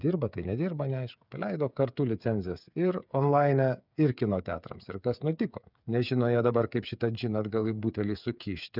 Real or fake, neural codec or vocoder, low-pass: fake; codec, 16 kHz, 8 kbps, FreqCodec, smaller model; 5.4 kHz